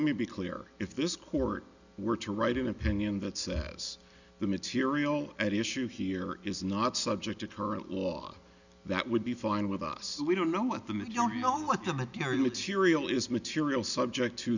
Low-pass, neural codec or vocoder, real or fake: 7.2 kHz; none; real